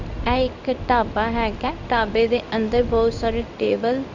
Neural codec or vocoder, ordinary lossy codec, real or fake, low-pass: codec, 16 kHz in and 24 kHz out, 1 kbps, XY-Tokenizer; none; fake; 7.2 kHz